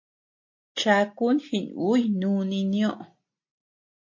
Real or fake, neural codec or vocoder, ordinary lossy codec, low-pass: real; none; MP3, 32 kbps; 7.2 kHz